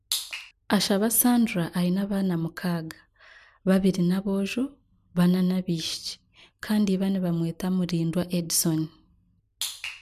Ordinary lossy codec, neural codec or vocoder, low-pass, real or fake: none; none; 14.4 kHz; real